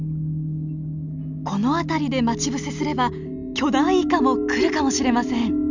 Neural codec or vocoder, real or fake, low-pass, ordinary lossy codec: none; real; 7.2 kHz; none